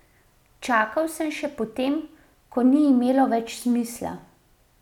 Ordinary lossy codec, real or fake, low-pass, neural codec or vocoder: none; real; 19.8 kHz; none